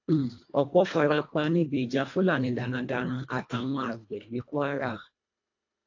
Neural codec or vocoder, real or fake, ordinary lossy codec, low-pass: codec, 24 kHz, 1.5 kbps, HILCodec; fake; AAC, 48 kbps; 7.2 kHz